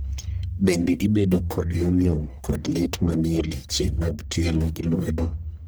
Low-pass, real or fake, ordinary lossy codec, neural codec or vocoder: none; fake; none; codec, 44.1 kHz, 1.7 kbps, Pupu-Codec